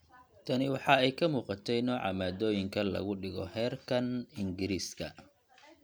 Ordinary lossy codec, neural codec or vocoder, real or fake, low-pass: none; none; real; none